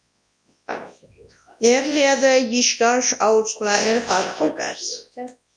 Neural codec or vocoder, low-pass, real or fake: codec, 24 kHz, 0.9 kbps, WavTokenizer, large speech release; 9.9 kHz; fake